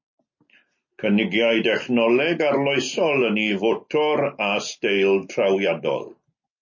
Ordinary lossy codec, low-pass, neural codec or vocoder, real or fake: MP3, 32 kbps; 7.2 kHz; none; real